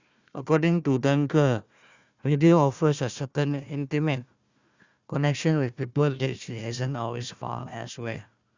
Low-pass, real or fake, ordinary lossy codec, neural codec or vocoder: 7.2 kHz; fake; Opus, 64 kbps; codec, 16 kHz, 1 kbps, FunCodec, trained on Chinese and English, 50 frames a second